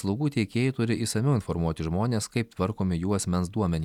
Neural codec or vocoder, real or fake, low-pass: none; real; 19.8 kHz